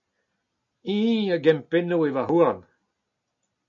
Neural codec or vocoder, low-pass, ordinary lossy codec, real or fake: none; 7.2 kHz; MP3, 96 kbps; real